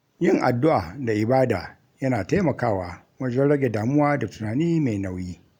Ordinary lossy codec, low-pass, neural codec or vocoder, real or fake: none; 19.8 kHz; none; real